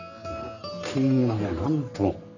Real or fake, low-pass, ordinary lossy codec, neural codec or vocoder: fake; 7.2 kHz; none; codec, 44.1 kHz, 3.4 kbps, Pupu-Codec